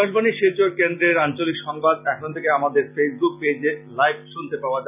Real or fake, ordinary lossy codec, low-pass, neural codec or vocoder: real; none; 3.6 kHz; none